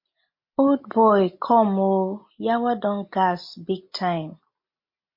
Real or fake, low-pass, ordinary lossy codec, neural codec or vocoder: real; 5.4 kHz; MP3, 32 kbps; none